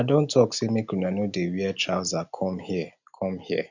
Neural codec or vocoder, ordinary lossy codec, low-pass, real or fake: none; none; 7.2 kHz; real